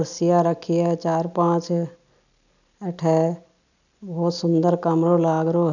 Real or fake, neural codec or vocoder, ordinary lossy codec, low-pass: real; none; none; 7.2 kHz